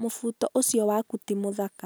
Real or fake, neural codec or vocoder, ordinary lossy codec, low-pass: real; none; none; none